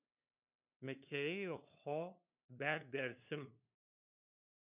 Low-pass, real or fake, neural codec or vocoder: 3.6 kHz; fake; codec, 16 kHz, 2 kbps, FunCodec, trained on LibriTTS, 25 frames a second